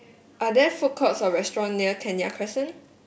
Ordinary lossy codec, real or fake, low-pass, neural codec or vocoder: none; real; none; none